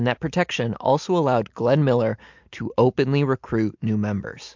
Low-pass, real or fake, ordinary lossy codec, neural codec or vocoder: 7.2 kHz; real; MP3, 64 kbps; none